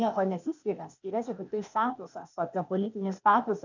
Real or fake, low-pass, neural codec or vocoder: fake; 7.2 kHz; codec, 16 kHz, 0.8 kbps, ZipCodec